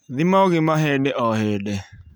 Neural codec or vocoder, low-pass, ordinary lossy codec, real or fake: none; none; none; real